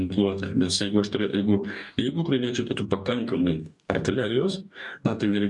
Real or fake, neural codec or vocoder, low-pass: fake; codec, 44.1 kHz, 2.6 kbps, DAC; 10.8 kHz